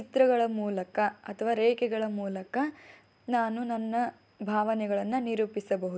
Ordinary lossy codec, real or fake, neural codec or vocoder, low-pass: none; real; none; none